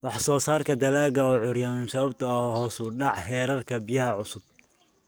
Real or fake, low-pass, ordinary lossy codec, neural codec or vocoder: fake; none; none; codec, 44.1 kHz, 7.8 kbps, DAC